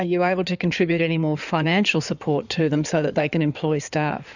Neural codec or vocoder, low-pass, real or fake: codec, 16 kHz in and 24 kHz out, 2.2 kbps, FireRedTTS-2 codec; 7.2 kHz; fake